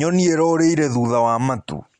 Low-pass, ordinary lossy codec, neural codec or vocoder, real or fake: 9.9 kHz; Opus, 64 kbps; none; real